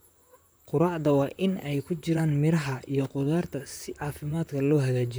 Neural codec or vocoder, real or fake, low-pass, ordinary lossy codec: vocoder, 44.1 kHz, 128 mel bands, Pupu-Vocoder; fake; none; none